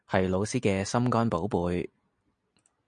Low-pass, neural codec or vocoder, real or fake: 9.9 kHz; none; real